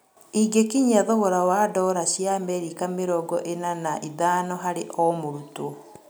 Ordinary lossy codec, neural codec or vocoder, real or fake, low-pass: none; none; real; none